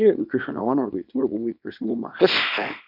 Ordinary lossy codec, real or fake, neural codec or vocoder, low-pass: none; fake; codec, 24 kHz, 0.9 kbps, WavTokenizer, small release; 5.4 kHz